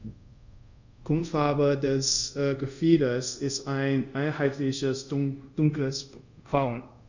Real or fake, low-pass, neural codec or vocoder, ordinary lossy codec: fake; 7.2 kHz; codec, 24 kHz, 0.5 kbps, DualCodec; none